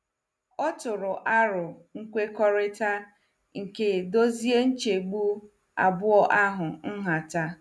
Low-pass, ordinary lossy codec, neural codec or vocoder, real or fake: none; none; none; real